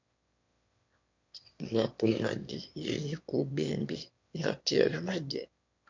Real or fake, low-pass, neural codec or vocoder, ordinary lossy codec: fake; 7.2 kHz; autoencoder, 22.05 kHz, a latent of 192 numbers a frame, VITS, trained on one speaker; MP3, 48 kbps